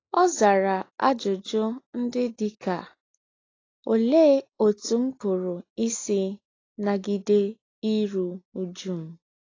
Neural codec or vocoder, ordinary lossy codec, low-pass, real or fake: none; AAC, 32 kbps; 7.2 kHz; real